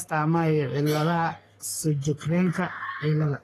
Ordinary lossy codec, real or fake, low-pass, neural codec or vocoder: AAC, 48 kbps; fake; 14.4 kHz; codec, 44.1 kHz, 3.4 kbps, Pupu-Codec